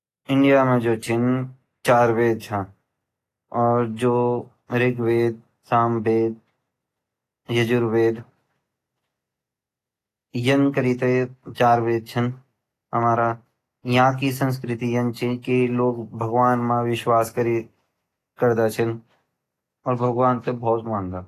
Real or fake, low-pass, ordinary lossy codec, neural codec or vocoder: real; 14.4 kHz; AAC, 48 kbps; none